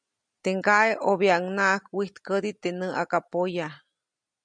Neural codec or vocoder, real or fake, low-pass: none; real; 9.9 kHz